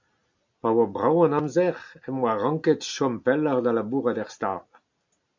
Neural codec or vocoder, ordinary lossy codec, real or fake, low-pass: none; MP3, 64 kbps; real; 7.2 kHz